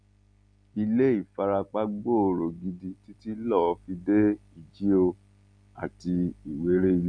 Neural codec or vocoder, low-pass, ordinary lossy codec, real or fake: none; 9.9 kHz; none; real